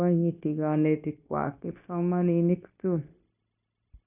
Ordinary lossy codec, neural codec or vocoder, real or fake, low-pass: none; codec, 16 kHz, 0.9 kbps, LongCat-Audio-Codec; fake; 3.6 kHz